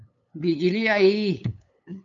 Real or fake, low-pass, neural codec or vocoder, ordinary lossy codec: fake; 7.2 kHz; codec, 16 kHz, 8 kbps, FunCodec, trained on LibriTTS, 25 frames a second; MP3, 96 kbps